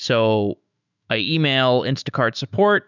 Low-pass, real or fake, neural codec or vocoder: 7.2 kHz; fake; autoencoder, 48 kHz, 128 numbers a frame, DAC-VAE, trained on Japanese speech